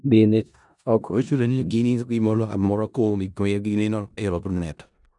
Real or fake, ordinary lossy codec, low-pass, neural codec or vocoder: fake; none; 10.8 kHz; codec, 16 kHz in and 24 kHz out, 0.4 kbps, LongCat-Audio-Codec, four codebook decoder